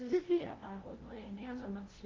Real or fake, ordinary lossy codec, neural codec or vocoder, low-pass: fake; Opus, 16 kbps; codec, 16 kHz, 0.5 kbps, FunCodec, trained on LibriTTS, 25 frames a second; 7.2 kHz